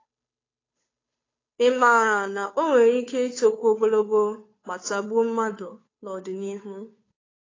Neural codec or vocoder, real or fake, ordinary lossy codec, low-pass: codec, 16 kHz, 2 kbps, FunCodec, trained on Chinese and English, 25 frames a second; fake; AAC, 32 kbps; 7.2 kHz